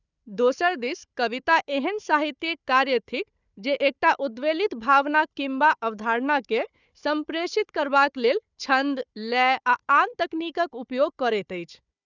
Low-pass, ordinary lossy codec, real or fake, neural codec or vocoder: 7.2 kHz; none; fake; codec, 16 kHz, 4 kbps, FunCodec, trained on Chinese and English, 50 frames a second